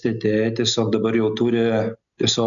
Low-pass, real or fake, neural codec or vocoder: 7.2 kHz; real; none